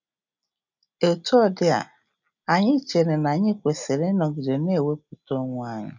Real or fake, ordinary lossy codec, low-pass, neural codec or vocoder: real; none; 7.2 kHz; none